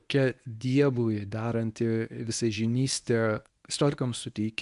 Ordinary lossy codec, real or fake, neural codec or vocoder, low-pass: AAC, 96 kbps; fake; codec, 24 kHz, 0.9 kbps, WavTokenizer, medium speech release version 1; 10.8 kHz